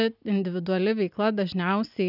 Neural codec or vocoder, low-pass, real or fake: none; 5.4 kHz; real